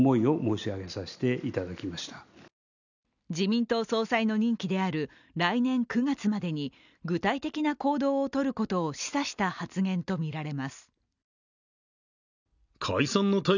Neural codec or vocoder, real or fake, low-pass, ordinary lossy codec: none; real; 7.2 kHz; none